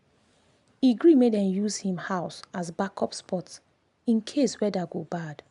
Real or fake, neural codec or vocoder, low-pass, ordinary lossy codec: real; none; 10.8 kHz; none